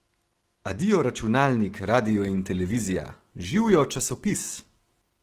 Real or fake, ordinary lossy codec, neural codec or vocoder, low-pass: fake; Opus, 16 kbps; autoencoder, 48 kHz, 128 numbers a frame, DAC-VAE, trained on Japanese speech; 14.4 kHz